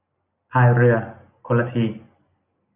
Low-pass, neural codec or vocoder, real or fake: 3.6 kHz; none; real